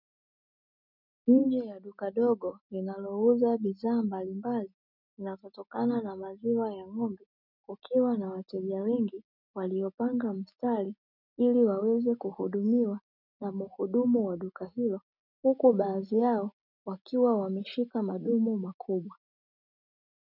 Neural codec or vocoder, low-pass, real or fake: none; 5.4 kHz; real